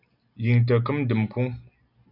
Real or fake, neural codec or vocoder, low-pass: real; none; 5.4 kHz